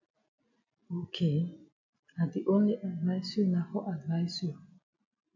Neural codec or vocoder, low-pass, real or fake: none; 7.2 kHz; real